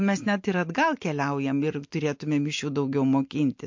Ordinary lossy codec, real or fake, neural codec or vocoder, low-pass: MP3, 48 kbps; real; none; 7.2 kHz